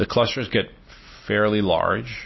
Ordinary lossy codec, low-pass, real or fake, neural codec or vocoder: MP3, 24 kbps; 7.2 kHz; real; none